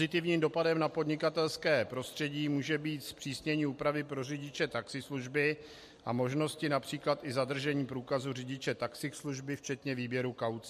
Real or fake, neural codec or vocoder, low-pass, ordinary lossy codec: real; none; 14.4 kHz; MP3, 64 kbps